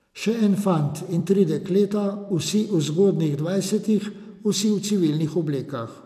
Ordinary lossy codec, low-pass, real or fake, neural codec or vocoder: none; 14.4 kHz; fake; vocoder, 44.1 kHz, 128 mel bands every 256 samples, BigVGAN v2